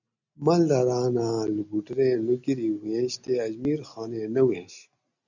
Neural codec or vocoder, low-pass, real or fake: none; 7.2 kHz; real